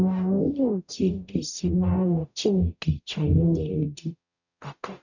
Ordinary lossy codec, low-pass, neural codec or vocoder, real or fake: none; 7.2 kHz; codec, 44.1 kHz, 0.9 kbps, DAC; fake